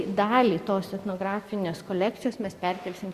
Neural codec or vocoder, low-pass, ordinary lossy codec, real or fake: none; 14.4 kHz; Opus, 64 kbps; real